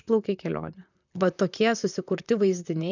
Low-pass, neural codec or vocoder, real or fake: 7.2 kHz; vocoder, 44.1 kHz, 128 mel bands, Pupu-Vocoder; fake